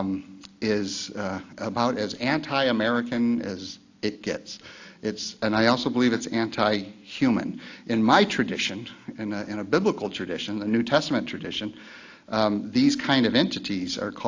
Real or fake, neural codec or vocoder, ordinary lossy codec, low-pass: real; none; AAC, 48 kbps; 7.2 kHz